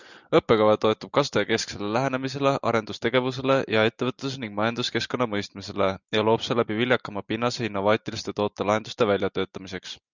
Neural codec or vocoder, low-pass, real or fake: none; 7.2 kHz; real